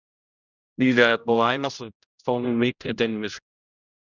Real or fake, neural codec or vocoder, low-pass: fake; codec, 16 kHz, 0.5 kbps, X-Codec, HuBERT features, trained on general audio; 7.2 kHz